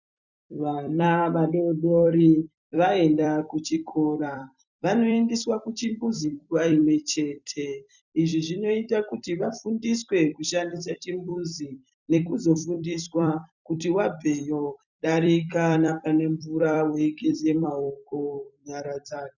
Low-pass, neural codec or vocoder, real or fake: 7.2 kHz; vocoder, 44.1 kHz, 128 mel bands every 256 samples, BigVGAN v2; fake